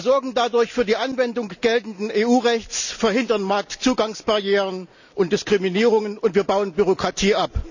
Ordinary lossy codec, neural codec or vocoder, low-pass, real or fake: none; none; 7.2 kHz; real